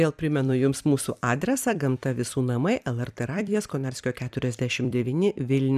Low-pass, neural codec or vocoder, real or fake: 14.4 kHz; vocoder, 44.1 kHz, 128 mel bands every 256 samples, BigVGAN v2; fake